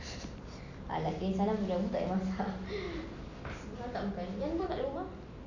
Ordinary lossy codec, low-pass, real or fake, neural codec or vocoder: none; 7.2 kHz; real; none